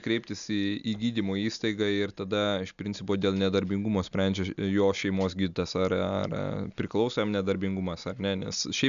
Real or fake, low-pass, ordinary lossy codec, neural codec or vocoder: real; 7.2 kHz; MP3, 96 kbps; none